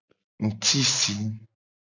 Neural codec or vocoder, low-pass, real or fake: none; 7.2 kHz; real